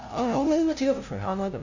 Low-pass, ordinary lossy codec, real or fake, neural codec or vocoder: 7.2 kHz; none; fake; codec, 16 kHz, 0.5 kbps, FunCodec, trained on LibriTTS, 25 frames a second